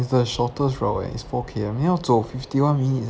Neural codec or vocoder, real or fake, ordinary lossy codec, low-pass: none; real; none; none